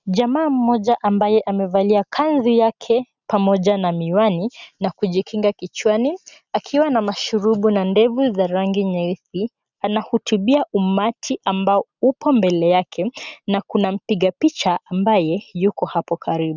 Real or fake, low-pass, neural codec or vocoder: real; 7.2 kHz; none